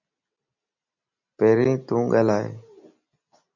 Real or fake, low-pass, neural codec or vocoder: real; 7.2 kHz; none